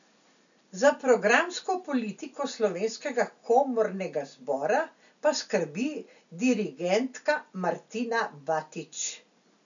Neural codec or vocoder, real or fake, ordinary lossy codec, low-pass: none; real; none; 7.2 kHz